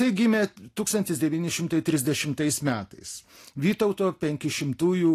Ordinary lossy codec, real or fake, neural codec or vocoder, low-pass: AAC, 48 kbps; real; none; 14.4 kHz